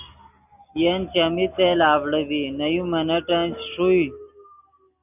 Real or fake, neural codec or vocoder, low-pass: real; none; 3.6 kHz